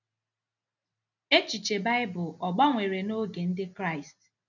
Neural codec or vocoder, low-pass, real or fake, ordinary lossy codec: none; 7.2 kHz; real; none